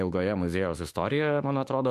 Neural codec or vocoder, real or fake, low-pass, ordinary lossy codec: autoencoder, 48 kHz, 32 numbers a frame, DAC-VAE, trained on Japanese speech; fake; 14.4 kHz; MP3, 64 kbps